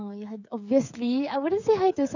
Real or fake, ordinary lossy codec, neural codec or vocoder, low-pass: fake; none; codec, 16 kHz, 16 kbps, FreqCodec, smaller model; 7.2 kHz